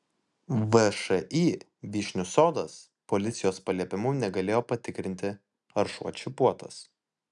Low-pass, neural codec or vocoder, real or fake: 10.8 kHz; none; real